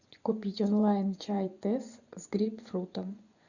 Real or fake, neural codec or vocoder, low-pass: fake; vocoder, 24 kHz, 100 mel bands, Vocos; 7.2 kHz